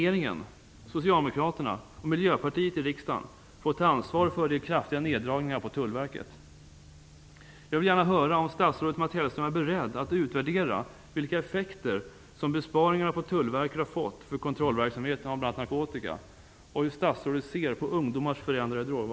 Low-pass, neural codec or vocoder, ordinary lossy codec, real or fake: none; none; none; real